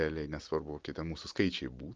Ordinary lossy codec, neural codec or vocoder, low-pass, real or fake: Opus, 24 kbps; none; 7.2 kHz; real